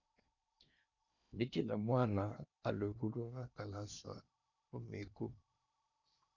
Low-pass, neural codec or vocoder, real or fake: 7.2 kHz; codec, 16 kHz in and 24 kHz out, 0.8 kbps, FocalCodec, streaming, 65536 codes; fake